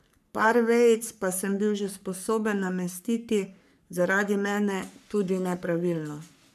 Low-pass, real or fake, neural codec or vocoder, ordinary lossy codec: 14.4 kHz; fake; codec, 44.1 kHz, 3.4 kbps, Pupu-Codec; none